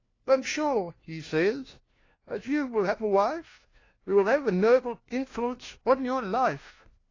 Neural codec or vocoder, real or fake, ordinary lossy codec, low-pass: codec, 16 kHz, 1 kbps, FunCodec, trained on LibriTTS, 50 frames a second; fake; AAC, 32 kbps; 7.2 kHz